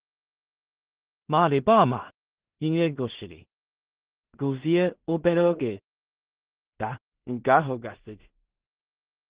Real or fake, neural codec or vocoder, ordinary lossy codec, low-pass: fake; codec, 16 kHz in and 24 kHz out, 0.4 kbps, LongCat-Audio-Codec, two codebook decoder; Opus, 16 kbps; 3.6 kHz